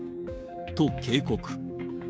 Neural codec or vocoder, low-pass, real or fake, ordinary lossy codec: codec, 16 kHz, 6 kbps, DAC; none; fake; none